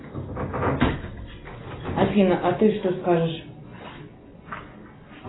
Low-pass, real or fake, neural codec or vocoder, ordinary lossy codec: 7.2 kHz; real; none; AAC, 16 kbps